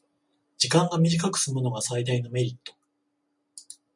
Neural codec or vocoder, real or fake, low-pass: none; real; 10.8 kHz